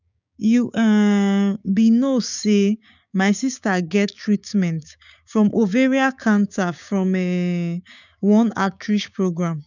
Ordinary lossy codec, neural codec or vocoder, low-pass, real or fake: none; codec, 16 kHz, 6 kbps, DAC; 7.2 kHz; fake